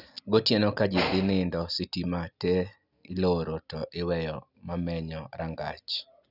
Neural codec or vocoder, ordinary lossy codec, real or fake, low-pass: none; none; real; 5.4 kHz